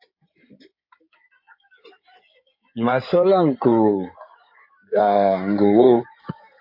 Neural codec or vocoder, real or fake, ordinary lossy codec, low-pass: codec, 16 kHz in and 24 kHz out, 2.2 kbps, FireRedTTS-2 codec; fake; MP3, 32 kbps; 5.4 kHz